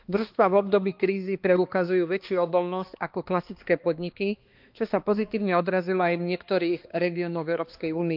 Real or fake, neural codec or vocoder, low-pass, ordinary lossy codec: fake; codec, 16 kHz, 2 kbps, X-Codec, HuBERT features, trained on balanced general audio; 5.4 kHz; Opus, 24 kbps